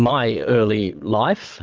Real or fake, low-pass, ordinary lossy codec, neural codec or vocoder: fake; 7.2 kHz; Opus, 24 kbps; vocoder, 22.05 kHz, 80 mel bands, Vocos